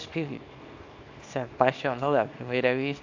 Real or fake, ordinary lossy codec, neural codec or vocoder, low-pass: fake; AAC, 48 kbps; codec, 24 kHz, 0.9 kbps, WavTokenizer, small release; 7.2 kHz